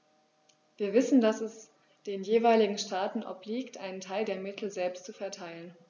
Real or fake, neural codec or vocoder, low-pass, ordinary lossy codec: real; none; 7.2 kHz; none